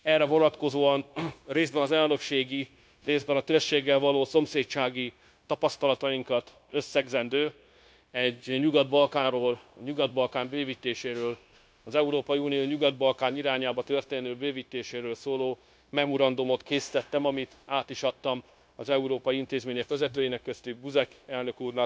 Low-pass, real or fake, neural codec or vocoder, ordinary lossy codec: none; fake; codec, 16 kHz, 0.9 kbps, LongCat-Audio-Codec; none